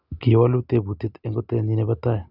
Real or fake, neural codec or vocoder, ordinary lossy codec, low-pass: real; none; none; 5.4 kHz